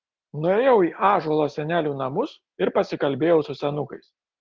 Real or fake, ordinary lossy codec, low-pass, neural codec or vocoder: real; Opus, 16 kbps; 7.2 kHz; none